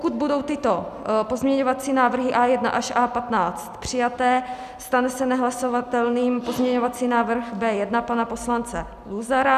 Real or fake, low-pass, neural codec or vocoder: real; 14.4 kHz; none